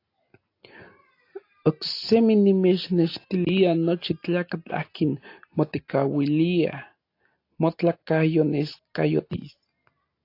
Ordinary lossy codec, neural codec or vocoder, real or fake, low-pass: AAC, 32 kbps; none; real; 5.4 kHz